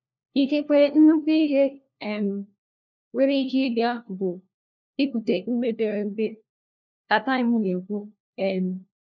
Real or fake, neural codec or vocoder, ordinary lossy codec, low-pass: fake; codec, 16 kHz, 1 kbps, FunCodec, trained on LibriTTS, 50 frames a second; none; 7.2 kHz